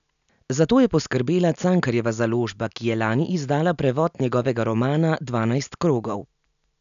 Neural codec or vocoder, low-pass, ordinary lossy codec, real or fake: none; 7.2 kHz; none; real